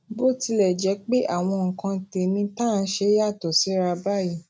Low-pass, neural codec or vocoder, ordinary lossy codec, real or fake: none; none; none; real